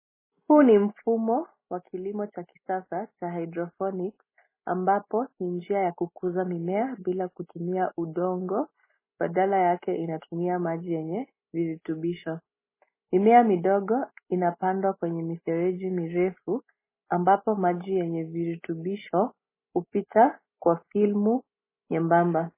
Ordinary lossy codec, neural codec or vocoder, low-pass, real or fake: MP3, 16 kbps; none; 3.6 kHz; real